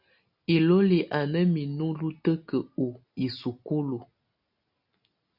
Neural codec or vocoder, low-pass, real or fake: none; 5.4 kHz; real